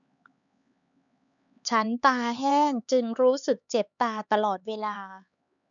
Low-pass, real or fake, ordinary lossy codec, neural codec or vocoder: 7.2 kHz; fake; none; codec, 16 kHz, 4 kbps, X-Codec, HuBERT features, trained on LibriSpeech